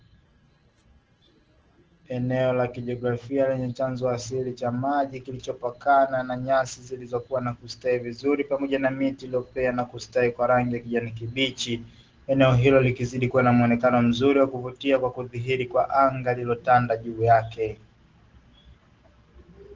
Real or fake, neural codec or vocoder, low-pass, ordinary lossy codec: real; none; 7.2 kHz; Opus, 16 kbps